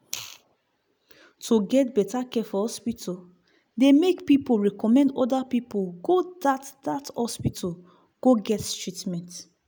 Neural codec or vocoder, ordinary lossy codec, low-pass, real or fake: none; none; none; real